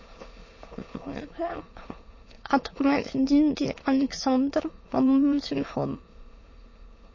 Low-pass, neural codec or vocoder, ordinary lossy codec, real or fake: 7.2 kHz; autoencoder, 22.05 kHz, a latent of 192 numbers a frame, VITS, trained on many speakers; MP3, 32 kbps; fake